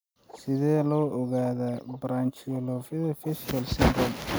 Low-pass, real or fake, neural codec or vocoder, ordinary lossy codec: none; real; none; none